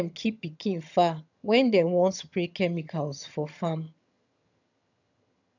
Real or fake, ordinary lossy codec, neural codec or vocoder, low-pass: fake; none; vocoder, 22.05 kHz, 80 mel bands, HiFi-GAN; 7.2 kHz